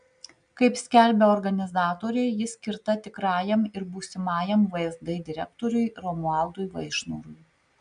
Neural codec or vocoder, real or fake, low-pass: none; real; 9.9 kHz